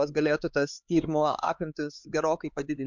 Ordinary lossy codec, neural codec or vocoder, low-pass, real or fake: MP3, 64 kbps; codec, 16 kHz, 4 kbps, X-Codec, HuBERT features, trained on LibriSpeech; 7.2 kHz; fake